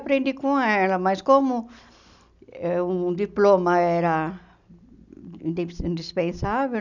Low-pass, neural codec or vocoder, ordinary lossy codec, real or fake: 7.2 kHz; none; none; real